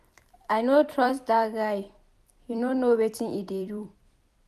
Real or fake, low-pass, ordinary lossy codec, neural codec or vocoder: fake; 14.4 kHz; none; vocoder, 44.1 kHz, 128 mel bands every 256 samples, BigVGAN v2